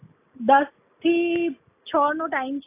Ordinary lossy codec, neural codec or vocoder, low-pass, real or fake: AAC, 16 kbps; none; 3.6 kHz; real